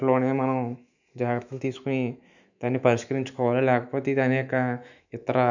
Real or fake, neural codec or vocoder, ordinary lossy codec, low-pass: fake; autoencoder, 48 kHz, 128 numbers a frame, DAC-VAE, trained on Japanese speech; none; 7.2 kHz